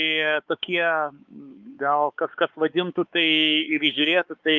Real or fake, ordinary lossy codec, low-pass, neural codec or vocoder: fake; Opus, 24 kbps; 7.2 kHz; codec, 16 kHz, 4 kbps, X-Codec, WavLM features, trained on Multilingual LibriSpeech